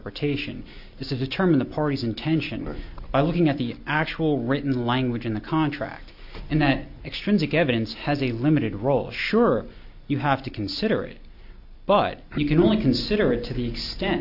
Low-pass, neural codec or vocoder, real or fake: 5.4 kHz; none; real